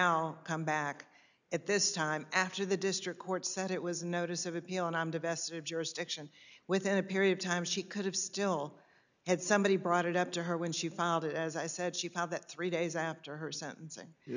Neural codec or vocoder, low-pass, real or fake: none; 7.2 kHz; real